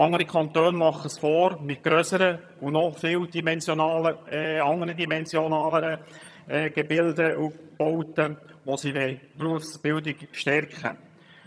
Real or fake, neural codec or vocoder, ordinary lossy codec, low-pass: fake; vocoder, 22.05 kHz, 80 mel bands, HiFi-GAN; none; none